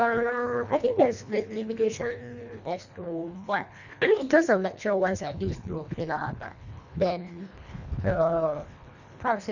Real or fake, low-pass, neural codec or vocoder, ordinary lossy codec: fake; 7.2 kHz; codec, 24 kHz, 1.5 kbps, HILCodec; none